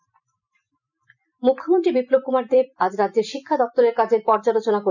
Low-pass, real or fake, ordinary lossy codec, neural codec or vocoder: 7.2 kHz; fake; none; vocoder, 44.1 kHz, 128 mel bands every 256 samples, BigVGAN v2